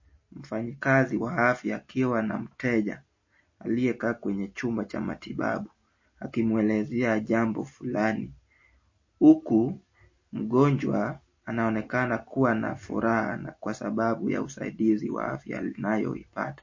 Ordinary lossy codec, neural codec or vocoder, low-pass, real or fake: MP3, 32 kbps; none; 7.2 kHz; real